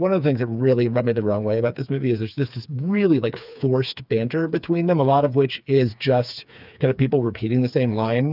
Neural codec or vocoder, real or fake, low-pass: codec, 16 kHz, 4 kbps, FreqCodec, smaller model; fake; 5.4 kHz